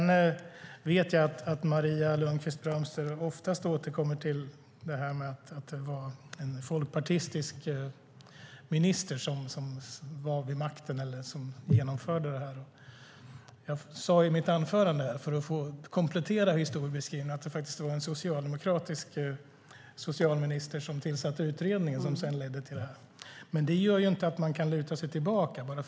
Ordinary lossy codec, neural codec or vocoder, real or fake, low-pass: none; none; real; none